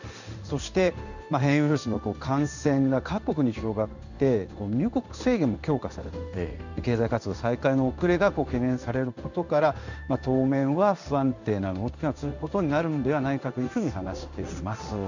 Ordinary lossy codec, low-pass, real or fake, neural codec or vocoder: none; 7.2 kHz; fake; codec, 16 kHz in and 24 kHz out, 1 kbps, XY-Tokenizer